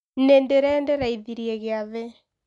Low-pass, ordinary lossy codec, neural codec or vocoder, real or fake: 10.8 kHz; none; none; real